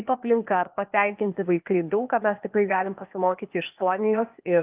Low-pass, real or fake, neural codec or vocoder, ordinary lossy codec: 3.6 kHz; fake; codec, 16 kHz, 0.8 kbps, ZipCodec; Opus, 32 kbps